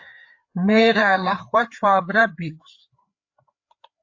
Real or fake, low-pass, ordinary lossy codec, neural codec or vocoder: fake; 7.2 kHz; AAC, 48 kbps; codec, 16 kHz, 4 kbps, FreqCodec, larger model